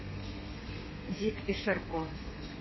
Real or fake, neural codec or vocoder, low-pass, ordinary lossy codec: fake; codec, 32 kHz, 1.9 kbps, SNAC; 7.2 kHz; MP3, 24 kbps